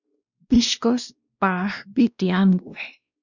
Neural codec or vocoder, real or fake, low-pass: codec, 16 kHz, 1 kbps, X-Codec, WavLM features, trained on Multilingual LibriSpeech; fake; 7.2 kHz